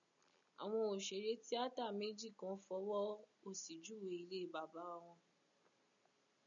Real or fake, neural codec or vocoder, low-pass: real; none; 7.2 kHz